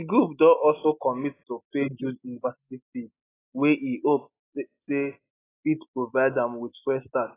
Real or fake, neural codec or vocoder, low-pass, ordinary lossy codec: real; none; 3.6 kHz; AAC, 16 kbps